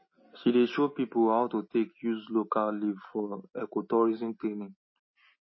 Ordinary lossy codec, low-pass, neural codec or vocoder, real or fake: MP3, 24 kbps; 7.2 kHz; none; real